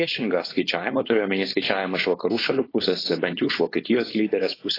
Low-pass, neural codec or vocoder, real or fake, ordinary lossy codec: 5.4 kHz; codec, 16 kHz, 4.8 kbps, FACodec; fake; AAC, 24 kbps